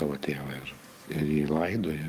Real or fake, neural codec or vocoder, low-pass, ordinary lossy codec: fake; codec, 44.1 kHz, 7.8 kbps, DAC; 14.4 kHz; Opus, 32 kbps